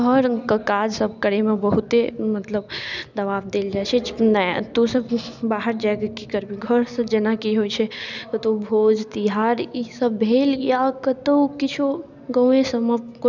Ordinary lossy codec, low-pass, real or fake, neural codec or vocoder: none; 7.2 kHz; real; none